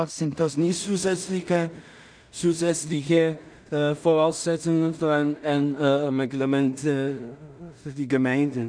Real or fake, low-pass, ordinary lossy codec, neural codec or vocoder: fake; 9.9 kHz; none; codec, 16 kHz in and 24 kHz out, 0.4 kbps, LongCat-Audio-Codec, two codebook decoder